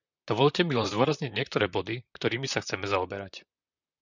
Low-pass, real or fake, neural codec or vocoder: 7.2 kHz; fake; vocoder, 44.1 kHz, 128 mel bands, Pupu-Vocoder